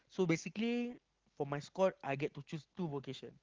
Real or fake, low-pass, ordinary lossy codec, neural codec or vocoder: real; 7.2 kHz; Opus, 16 kbps; none